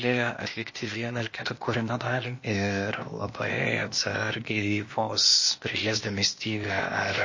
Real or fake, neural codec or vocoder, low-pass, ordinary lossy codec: fake; codec, 16 kHz, 0.8 kbps, ZipCodec; 7.2 kHz; MP3, 32 kbps